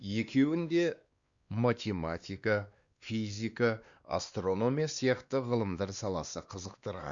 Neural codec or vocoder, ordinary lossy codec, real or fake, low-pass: codec, 16 kHz, 2 kbps, X-Codec, WavLM features, trained on Multilingual LibriSpeech; Opus, 64 kbps; fake; 7.2 kHz